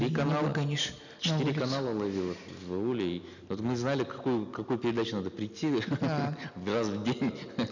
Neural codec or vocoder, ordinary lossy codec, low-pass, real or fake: none; none; 7.2 kHz; real